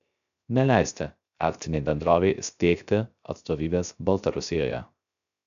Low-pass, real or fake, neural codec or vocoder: 7.2 kHz; fake; codec, 16 kHz, 0.3 kbps, FocalCodec